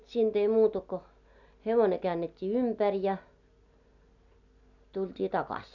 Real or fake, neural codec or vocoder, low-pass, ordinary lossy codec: real; none; 7.2 kHz; none